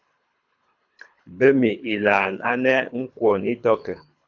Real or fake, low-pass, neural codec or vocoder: fake; 7.2 kHz; codec, 24 kHz, 3 kbps, HILCodec